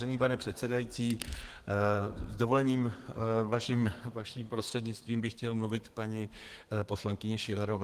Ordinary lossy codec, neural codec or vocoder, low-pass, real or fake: Opus, 24 kbps; codec, 32 kHz, 1.9 kbps, SNAC; 14.4 kHz; fake